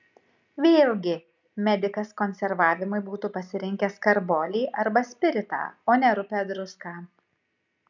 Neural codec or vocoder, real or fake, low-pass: none; real; 7.2 kHz